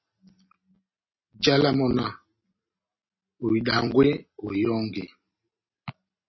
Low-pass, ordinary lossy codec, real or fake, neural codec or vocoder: 7.2 kHz; MP3, 24 kbps; real; none